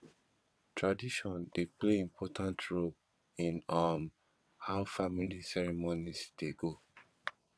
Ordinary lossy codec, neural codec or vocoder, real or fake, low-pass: none; vocoder, 22.05 kHz, 80 mel bands, WaveNeXt; fake; none